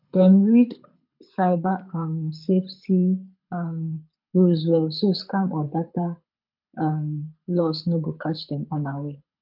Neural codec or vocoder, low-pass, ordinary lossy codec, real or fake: codec, 24 kHz, 6 kbps, HILCodec; 5.4 kHz; MP3, 48 kbps; fake